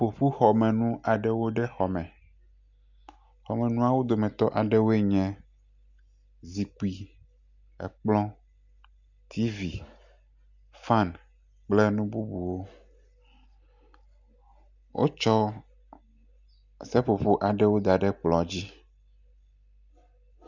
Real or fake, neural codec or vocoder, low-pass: real; none; 7.2 kHz